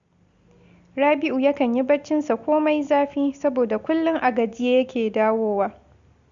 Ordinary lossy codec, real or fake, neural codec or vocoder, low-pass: Opus, 64 kbps; real; none; 7.2 kHz